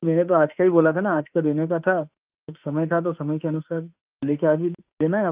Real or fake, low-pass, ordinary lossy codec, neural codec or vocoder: fake; 3.6 kHz; Opus, 24 kbps; autoencoder, 48 kHz, 32 numbers a frame, DAC-VAE, trained on Japanese speech